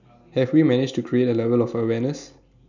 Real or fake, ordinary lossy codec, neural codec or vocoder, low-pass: real; none; none; 7.2 kHz